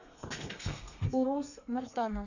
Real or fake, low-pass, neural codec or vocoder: fake; 7.2 kHz; codec, 32 kHz, 1.9 kbps, SNAC